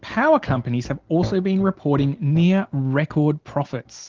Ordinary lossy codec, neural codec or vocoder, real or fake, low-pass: Opus, 16 kbps; none; real; 7.2 kHz